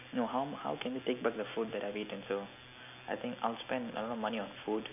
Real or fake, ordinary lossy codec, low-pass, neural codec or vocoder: real; AAC, 32 kbps; 3.6 kHz; none